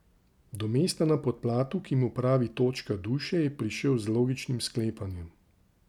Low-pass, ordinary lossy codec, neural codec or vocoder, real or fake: 19.8 kHz; none; none; real